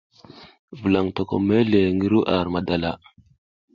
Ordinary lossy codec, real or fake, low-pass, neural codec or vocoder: Opus, 64 kbps; real; 7.2 kHz; none